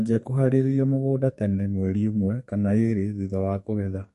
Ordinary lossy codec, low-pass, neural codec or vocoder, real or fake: MP3, 48 kbps; 14.4 kHz; codec, 32 kHz, 1.9 kbps, SNAC; fake